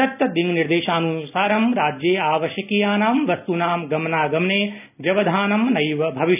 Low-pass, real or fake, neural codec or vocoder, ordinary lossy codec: 3.6 kHz; real; none; none